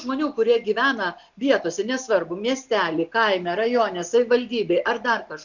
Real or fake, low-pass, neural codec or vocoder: real; 7.2 kHz; none